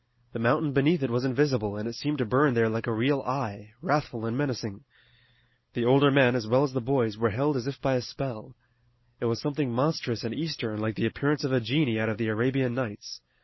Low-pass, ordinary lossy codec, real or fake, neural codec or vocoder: 7.2 kHz; MP3, 24 kbps; real; none